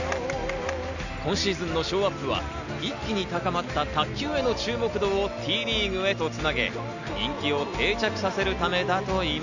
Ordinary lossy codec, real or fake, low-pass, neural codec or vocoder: none; real; 7.2 kHz; none